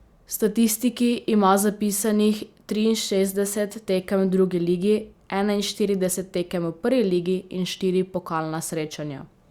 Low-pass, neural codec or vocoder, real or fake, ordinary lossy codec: 19.8 kHz; none; real; Opus, 64 kbps